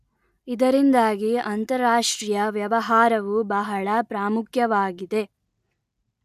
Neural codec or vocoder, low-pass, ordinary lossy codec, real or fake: none; 14.4 kHz; none; real